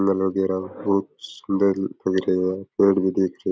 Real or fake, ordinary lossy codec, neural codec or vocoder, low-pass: real; none; none; none